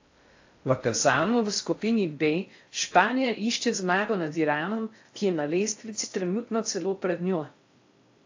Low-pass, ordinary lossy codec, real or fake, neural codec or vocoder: 7.2 kHz; AAC, 48 kbps; fake; codec, 16 kHz in and 24 kHz out, 0.6 kbps, FocalCodec, streaming, 4096 codes